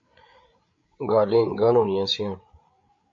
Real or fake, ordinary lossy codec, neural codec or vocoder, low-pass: fake; MP3, 48 kbps; codec, 16 kHz, 8 kbps, FreqCodec, larger model; 7.2 kHz